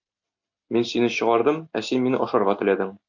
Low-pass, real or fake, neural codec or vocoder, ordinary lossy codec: 7.2 kHz; real; none; AAC, 48 kbps